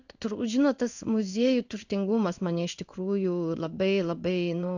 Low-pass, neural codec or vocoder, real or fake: 7.2 kHz; codec, 16 kHz in and 24 kHz out, 1 kbps, XY-Tokenizer; fake